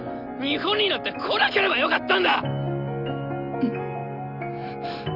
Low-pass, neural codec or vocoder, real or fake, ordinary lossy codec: 5.4 kHz; none; real; none